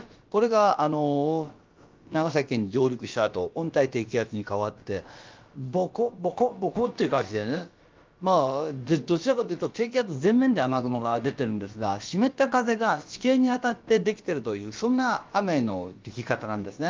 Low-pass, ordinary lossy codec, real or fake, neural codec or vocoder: 7.2 kHz; Opus, 24 kbps; fake; codec, 16 kHz, about 1 kbps, DyCAST, with the encoder's durations